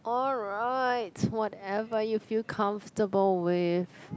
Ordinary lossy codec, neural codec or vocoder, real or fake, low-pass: none; none; real; none